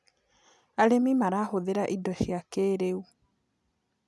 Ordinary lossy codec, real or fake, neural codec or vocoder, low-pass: none; real; none; none